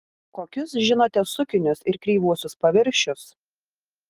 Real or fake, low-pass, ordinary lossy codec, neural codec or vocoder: real; 14.4 kHz; Opus, 24 kbps; none